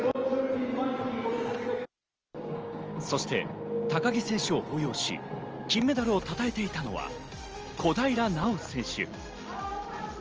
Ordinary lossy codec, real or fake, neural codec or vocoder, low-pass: Opus, 24 kbps; real; none; 7.2 kHz